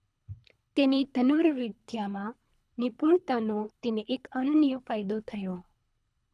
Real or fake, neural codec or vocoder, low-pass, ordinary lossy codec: fake; codec, 24 kHz, 3 kbps, HILCodec; none; none